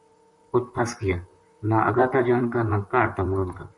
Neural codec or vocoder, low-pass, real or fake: vocoder, 44.1 kHz, 128 mel bands, Pupu-Vocoder; 10.8 kHz; fake